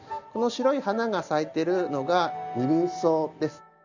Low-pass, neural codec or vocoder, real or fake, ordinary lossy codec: 7.2 kHz; none; real; none